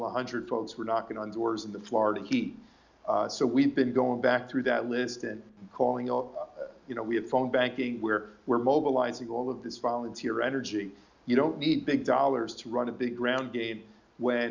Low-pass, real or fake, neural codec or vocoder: 7.2 kHz; real; none